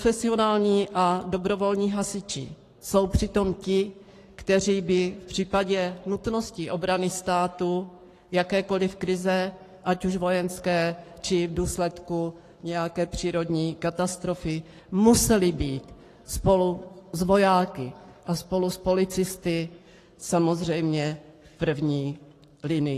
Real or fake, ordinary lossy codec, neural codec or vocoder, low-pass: fake; AAC, 48 kbps; codec, 44.1 kHz, 7.8 kbps, Pupu-Codec; 14.4 kHz